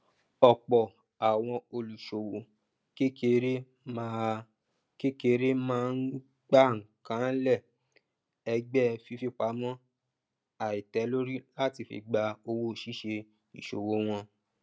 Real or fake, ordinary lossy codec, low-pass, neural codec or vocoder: real; none; none; none